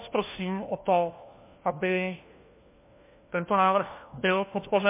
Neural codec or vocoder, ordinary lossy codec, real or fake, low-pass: codec, 16 kHz, 1 kbps, FunCodec, trained on LibriTTS, 50 frames a second; MP3, 24 kbps; fake; 3.6 kHz